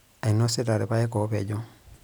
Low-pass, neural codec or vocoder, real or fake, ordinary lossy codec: none; none; real; none